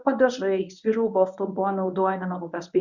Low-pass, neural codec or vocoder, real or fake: 7.2 kHz; codec, 24 kHz, 0.9 kbps, WavTokenizer, medium speech release version 1; fake